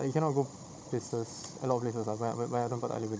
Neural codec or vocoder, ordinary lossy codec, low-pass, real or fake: codec, 16 kHz, 16 kbps, FunCodec, trained on Chinese and English, 50 frames a second; none; none; fake